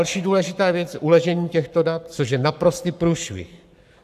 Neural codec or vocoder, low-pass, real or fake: codec, 44.1 kHz, 7.8 kbps, Pupu-Codec; 14.4 kHz; fake